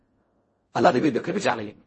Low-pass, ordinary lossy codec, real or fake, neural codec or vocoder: 10.8 kHz; MP3, 32 kbps; fake; codec, 16 kHz in and 24 kHz out, 0.4 kbps, LongCat-Audio-Codec, fine tuned four codebook decoder